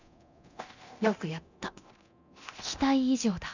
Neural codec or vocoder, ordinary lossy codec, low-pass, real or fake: codec, 24 kHz, 0.9 kbps, DualCodec; none; 7.2 kHz; fake